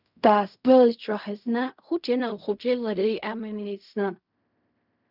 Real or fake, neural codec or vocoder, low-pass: fake; codec, 16 kHz in and 24 kHz out, 0.4 kbps, LongCat-Audio-Codec, fine tuned four codebook decoder; 5.4 kHz